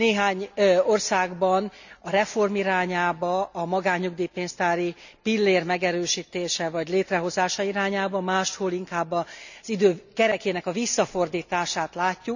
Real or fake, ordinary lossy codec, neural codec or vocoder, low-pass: real; none; none; 7.2 kHz